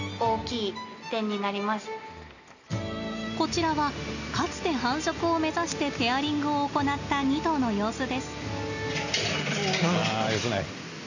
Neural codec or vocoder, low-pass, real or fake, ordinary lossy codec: none; 7.2 kHz; real; none